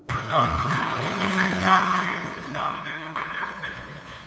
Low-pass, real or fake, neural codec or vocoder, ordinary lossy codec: none; fake; codec, 16 kHz, 2 kbps, FunCodec, trained on LibriTTS, 25 frames a second; none